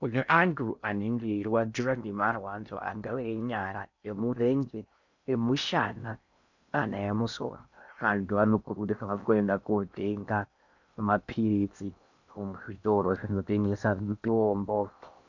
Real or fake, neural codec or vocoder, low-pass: fake; codec, 16 kHz in and 24 kHz out, 0.6 kbps, FocalCodec, streaming, 2048 codes; 7.2 kHz